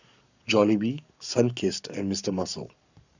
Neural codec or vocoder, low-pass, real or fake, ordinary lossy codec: codec, 44.1 kHz, 7.8 kbps, Pupu-Codec; 7.2 kHz; fake; none